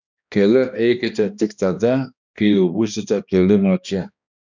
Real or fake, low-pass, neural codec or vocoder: fake; 7.2 kHz; codec, 16 kHz, 1 kbps, X-Codec, HuBERT features, trained on balanced general audio